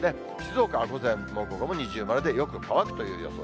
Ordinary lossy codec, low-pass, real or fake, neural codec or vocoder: none; none; real; none